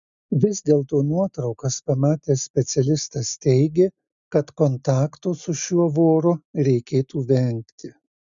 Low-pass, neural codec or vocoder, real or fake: 7.2 kHz; none; real